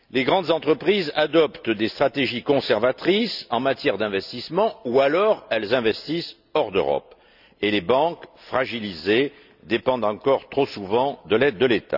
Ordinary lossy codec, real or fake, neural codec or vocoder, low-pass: none; real; none; 5.4 kHz